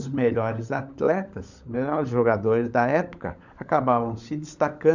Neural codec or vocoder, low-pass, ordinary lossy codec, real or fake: codec, 16 kHz, 16 kbps, FunCodec, trained on LibriTTS, 50 frames a second; 7.2 kHz; none; fake